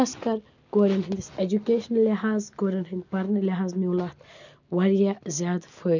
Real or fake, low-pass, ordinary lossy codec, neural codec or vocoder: real; 7.2 kHz; none; none